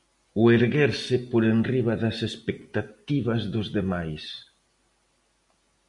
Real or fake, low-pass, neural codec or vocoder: real; 10.8 kHz; none